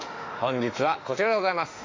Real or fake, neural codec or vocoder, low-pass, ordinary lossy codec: fake; autoencoder, 48 kHz, 32 numbers a frame, DAC-VAE, trained on Japanese speech; 7.2 kHz; AAC, 32 kbps